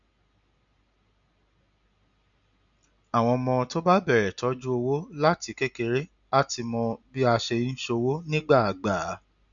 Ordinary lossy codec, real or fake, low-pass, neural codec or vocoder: none; real; 7.2 kHz; none